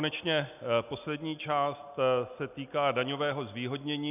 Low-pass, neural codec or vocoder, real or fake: 3.6 kHz; none; real